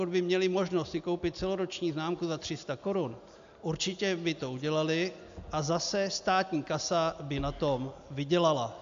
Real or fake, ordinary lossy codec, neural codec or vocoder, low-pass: real; AAC, 64 kbps; none; 7.2 kHz